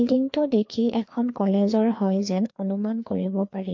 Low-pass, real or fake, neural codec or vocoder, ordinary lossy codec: 7.2 kHz; fake; codec, 16 kHz, 2 kbps, FreqCodec, larger model; MP3, 48 kbps